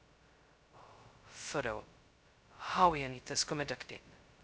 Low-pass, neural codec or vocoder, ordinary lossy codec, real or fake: none; codec, 16 kHz, 0.2 kbps, FocalCodec; none; fake